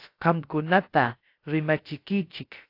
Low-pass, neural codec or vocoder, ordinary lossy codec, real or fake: 5.4 kHz; codec, 16 kHz, about 1 kbps, DyCAST, with the encoder's durations; AAC, 32 kbps; fake